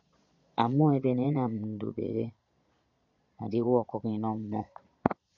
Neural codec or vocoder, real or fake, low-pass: vocoder, 22.05 kHz, 80 mel bands, Vocos; fake; 7.2 kHz